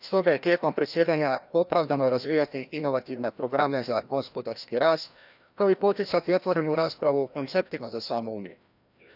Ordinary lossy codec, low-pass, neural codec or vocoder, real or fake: none; 5.4 kHz; codec, 16 kHz, 1 kbps, FreqCodec, larger model; fake